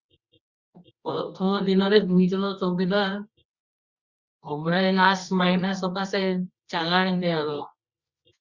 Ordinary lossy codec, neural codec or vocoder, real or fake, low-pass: Opus, 64 kbps; codec, 24 kHz, 0.9 kbps, WavTokenizer, medium music audio release; fake; 7.2 kHz